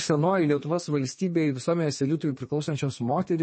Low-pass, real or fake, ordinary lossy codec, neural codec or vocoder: 9.9 kHz; fake; MP3, 32 kbps; codec, 32 kHz, 1.9 kbps, SNAC